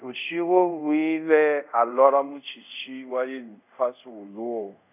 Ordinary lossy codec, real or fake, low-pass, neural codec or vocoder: none; fake; 3.6 kHz; codec, 24 kHz, 0.5 kbps, DualCodec